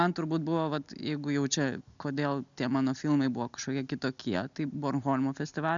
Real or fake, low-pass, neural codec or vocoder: real; 7.2 kHz; none